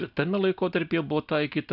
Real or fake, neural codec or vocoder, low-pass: real; none; 5.4 kHz